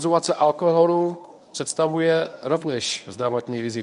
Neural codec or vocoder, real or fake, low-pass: codec, 24 kHz, 0.9 kbps, WavTokenizer, medium speech release version 1; fake; 10.8 kHz